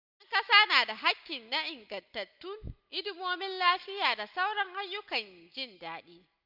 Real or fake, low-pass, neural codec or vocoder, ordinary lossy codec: real; 5.4 kHz; none; none